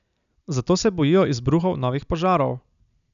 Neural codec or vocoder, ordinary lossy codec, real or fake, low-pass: none; none; real; 7.2 kHz